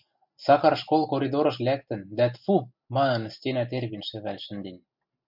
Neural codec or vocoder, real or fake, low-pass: none; real; 5.4 kHz